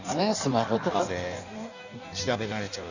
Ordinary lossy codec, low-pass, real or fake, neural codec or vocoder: none; 7.2 kHz; fake; codec, 16 kHz in and 24 kHz out, 1.1 kbps, FireRedTTS-2 codec